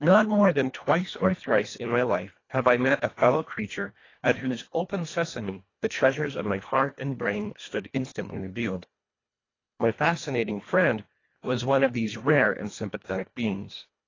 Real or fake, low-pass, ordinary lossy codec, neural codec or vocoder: fake; 7.2 kHz; AAC, 32 kbps; codec, 24 kHz, 1.5 kbps, HILCodec